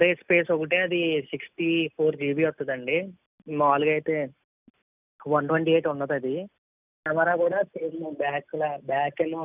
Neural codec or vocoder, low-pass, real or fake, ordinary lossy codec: none; 3.6 kHz; real; none